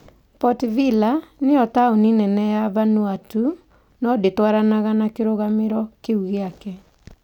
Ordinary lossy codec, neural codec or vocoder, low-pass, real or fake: none; none; 19.8 kHz; real